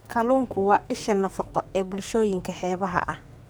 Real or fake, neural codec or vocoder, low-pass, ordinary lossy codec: fake; codec, 44.1 kHz, 2.6 kbps, SNAC; none; none